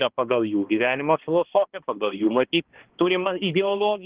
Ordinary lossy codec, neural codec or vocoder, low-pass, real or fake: Opus, 16 kbps; codec, 16 kHz, 2 kbps, X-Codec, HuBERT features, trained on balanced general audio; 3.6 kHz; fake